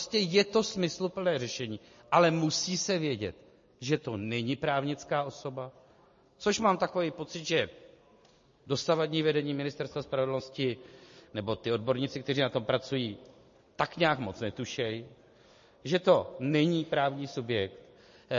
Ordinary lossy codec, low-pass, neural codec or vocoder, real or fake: MP3, 32 kbps; 7.2 kHz; none; real